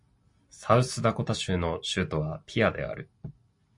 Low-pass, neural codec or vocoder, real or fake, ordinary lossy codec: 10.8 kHz; none; real; MP3, 48 kbps